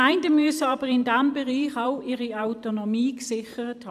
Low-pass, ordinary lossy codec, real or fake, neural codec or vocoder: 14.4 kHz; AAC, 96 kbps; fake; vocoder, 44.1 kHz, 128 mel bands every 512 samples, BigVGAN v2